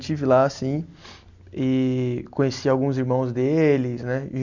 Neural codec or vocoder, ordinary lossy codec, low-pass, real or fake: none; none; 7.2 kHz; real